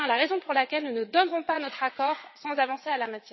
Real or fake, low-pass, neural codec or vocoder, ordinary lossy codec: fake; 7.2 kHz; vocoder, 22.05 kHz, 80 mel bands, WaveNeXt; MP3, 24 kbps